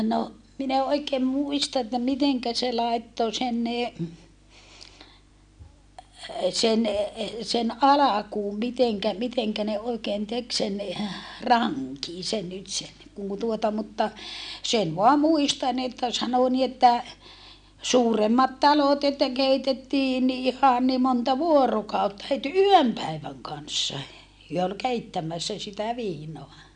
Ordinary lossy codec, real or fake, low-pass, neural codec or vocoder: none; real; 9.9 kHz; none